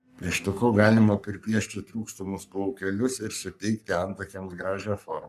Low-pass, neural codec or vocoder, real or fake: 14.4 kHz; codec, 44.1 kHz, 3.4 kbps, Pupu-Codec; fake